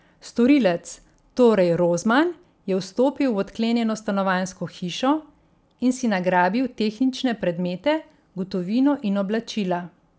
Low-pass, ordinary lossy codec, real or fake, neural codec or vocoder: none; none; real; none